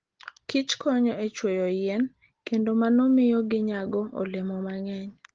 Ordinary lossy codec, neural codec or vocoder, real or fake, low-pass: Opus, 16 kbps; none; real; 7.2 kHz